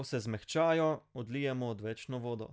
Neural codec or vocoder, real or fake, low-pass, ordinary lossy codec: none; real; none; none